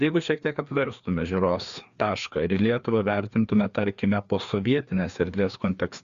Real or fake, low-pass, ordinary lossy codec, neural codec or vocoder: fake; 7.2 kHz; Opus, 64 kbps; codec, 16 kHz, 2 kbps, FreqCodec, larger model